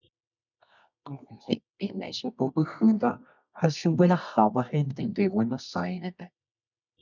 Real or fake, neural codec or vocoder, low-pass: fake; codec, 24 kHz, 0.9 kbps, WavTokenizer, medium music audio release; 7.2 kHz